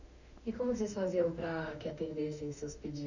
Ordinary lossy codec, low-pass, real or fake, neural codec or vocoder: none; 7.2 kHz; fake; autoencoder, 48 kHz, 32 numbers a frame, DAC-VAE, trained on Japanese speech